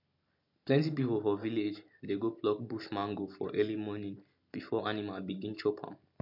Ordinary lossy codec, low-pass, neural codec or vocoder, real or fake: MP3, 48 kbps; 5.4 kHz; none; real